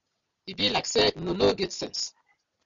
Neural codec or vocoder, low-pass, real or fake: none; 7.2 kHz; real